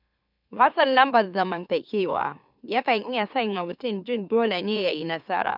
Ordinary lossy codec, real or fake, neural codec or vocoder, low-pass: none; fake; autoencoder, 44.1 kHz, a latent of 192 numbers a frame, MeloTTS; 5.4 kHz